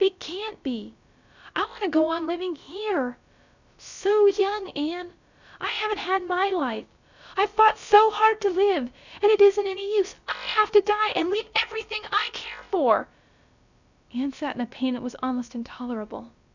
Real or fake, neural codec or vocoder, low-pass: fake; codec, 16 kHz, about 1 kbps, DyCAST, with the encoder's durations; 7.2 kHz